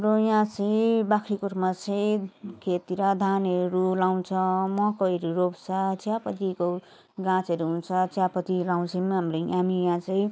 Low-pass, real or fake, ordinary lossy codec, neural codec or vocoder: none; real; none; none